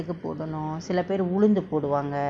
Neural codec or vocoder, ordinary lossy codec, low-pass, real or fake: none; none; 9.9 kHz; real